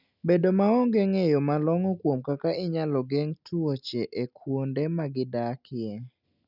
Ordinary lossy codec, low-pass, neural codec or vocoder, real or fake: none; 5.4 kHz; none; real